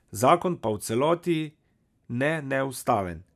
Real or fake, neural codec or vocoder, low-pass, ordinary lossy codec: real; none; 14.4 kHz; none